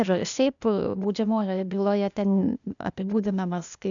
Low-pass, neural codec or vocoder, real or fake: 7.2 kHz; codec, 16 kHz, 0.8 kbps, ZipCodec; fake